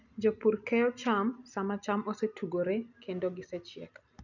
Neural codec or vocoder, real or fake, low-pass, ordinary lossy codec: none; real; 7.2 kHz; none